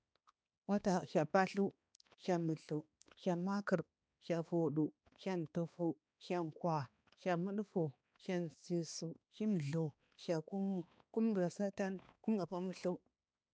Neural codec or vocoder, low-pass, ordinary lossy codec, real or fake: codec, 16 kHz, 2 kbps, X-Codec, HuBERT features, trained on balanced general audio; none; none; fake